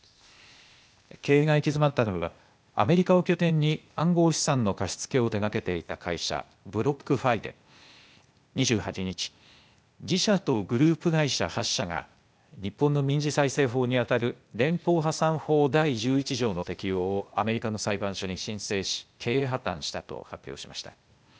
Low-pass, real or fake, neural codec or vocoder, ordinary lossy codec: none; fake; codec, 16 kHz, 0.8 kbps, ZipCodec; none